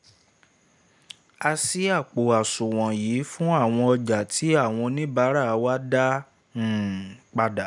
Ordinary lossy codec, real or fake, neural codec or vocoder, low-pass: none; real; none; 10.8 kHz